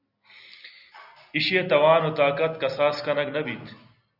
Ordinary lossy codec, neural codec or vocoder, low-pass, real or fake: Opus, 64 kbps; none; 5.4 kHz; real